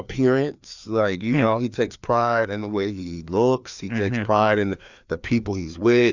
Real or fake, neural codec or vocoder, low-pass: fake; codec, 16 kHz, 2 kbps, FreqCodec, larger model; 7.2 kHz